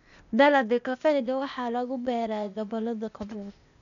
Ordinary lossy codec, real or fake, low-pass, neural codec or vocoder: none; fake; 7.2 kHz; codec, 16 kHz, 0.8 kbps, ZipCodec